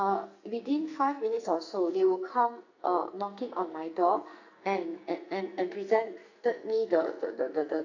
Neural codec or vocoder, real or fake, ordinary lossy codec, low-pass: codec, 44.1 kHz, 2.6 kbps, SNAC; fake; none; 7.2 kHz